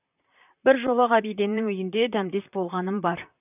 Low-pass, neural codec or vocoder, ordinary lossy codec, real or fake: 3.6 kHz; vocoder, 44.1 kHz, 128 mel bands, Pupu-Vocoder; none; fake